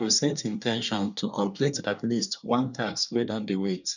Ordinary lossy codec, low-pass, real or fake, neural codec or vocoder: none; 7.2 kHz; fake; codec, 24 kHz, 1 kbps, SNAC